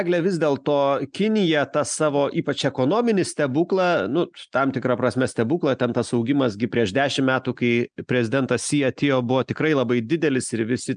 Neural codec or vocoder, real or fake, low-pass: none; real; 9.9 kHz